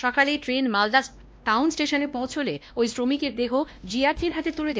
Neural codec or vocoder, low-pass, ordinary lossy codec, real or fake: codec, 16 kHz, 1 kbps, X-Codec, WavLM features, trained on Multilingual LibriSpeech; none; none; fake